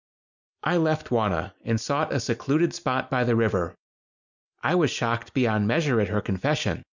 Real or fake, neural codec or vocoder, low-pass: real; none; 7.2 kHz